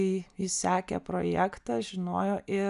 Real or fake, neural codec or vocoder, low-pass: real; none; 10.8 kHz